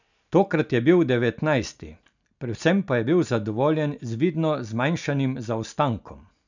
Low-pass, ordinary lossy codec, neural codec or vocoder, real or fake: 7.2 kHz; none; none; real